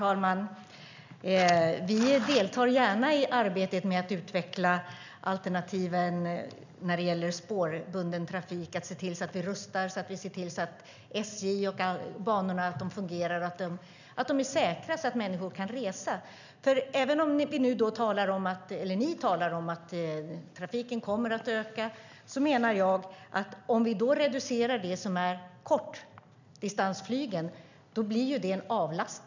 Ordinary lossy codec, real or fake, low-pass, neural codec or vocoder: none; real; 7.2 kHz; none